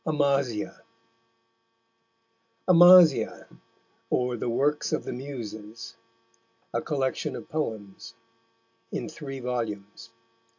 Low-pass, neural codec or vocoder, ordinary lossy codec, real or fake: 7.2 kHz; none; AAC, 48 kbps; real